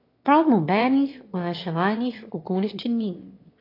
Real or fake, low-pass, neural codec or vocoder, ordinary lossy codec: fake; 5.4 kHz; autoencoder, 22.05 kHz, a latent of 192 numbers a frame, VITS, trained on one speaker; AAC, 32 kbps